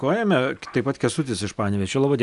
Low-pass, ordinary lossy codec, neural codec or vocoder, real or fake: 10.8 kHz; MP3, 96 kbps; none; real